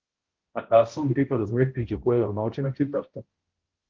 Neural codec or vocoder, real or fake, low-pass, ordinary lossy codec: codec, 16 kHz, 0.5 kbps, X-Codec, HuBERT features, trained on balanced general audio; fake; 7.2 kHz; Opus, 16 kbps